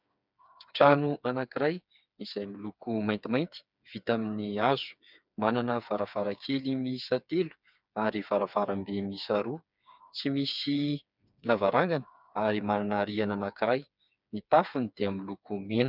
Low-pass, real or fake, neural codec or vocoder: 5.4 kHz; fake; codec, 16 kHz, 4 kbps, FreqCodec, smaller model